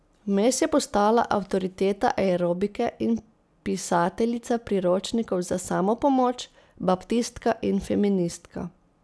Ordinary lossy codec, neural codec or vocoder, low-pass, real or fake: none; none; none; real